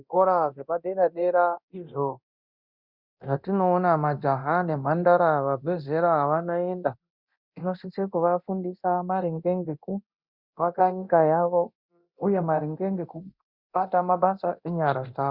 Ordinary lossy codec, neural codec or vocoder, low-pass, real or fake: Opus, 64 kbps; codec, 24 kHz, 0.9 kbps, DualCodec; 5.4 kHz; fake